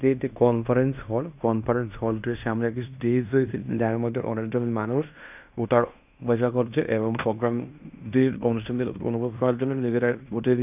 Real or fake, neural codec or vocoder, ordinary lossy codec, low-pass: fake; codec, 16 kHz in and 24 kHz out, 0.9 kbps, LongCat-Audio-Codec, fine tuned four codebook decoder; none; 3.6 kHz